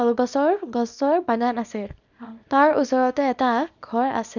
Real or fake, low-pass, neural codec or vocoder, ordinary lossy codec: fake; 7.2 kHz; codec, 24 kHz, 0.9 kbps, WavTokenizer, small release; none